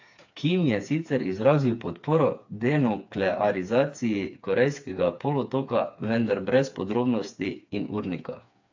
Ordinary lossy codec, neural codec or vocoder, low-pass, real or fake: AAC, 48 kbps; codec, 16 kHz, 4 kbps, FreqCodec, smaller model; 7.2 kHz; fake